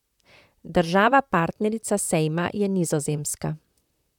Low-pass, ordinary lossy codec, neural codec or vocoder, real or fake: 19.8 kHz; none; vocoder, 44.1 kHz, 128 mel bands, Pupu-Vocoder; fake